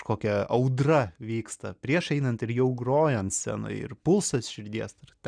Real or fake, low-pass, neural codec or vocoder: real; 9.9 kHz; none